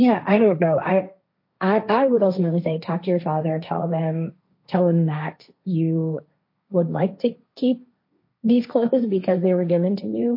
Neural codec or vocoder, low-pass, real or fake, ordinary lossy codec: codec, 16 kHz, 1.1 kbps, Voila-Tokenizer; 5.4 kHz; fake; MP3, 32 kbps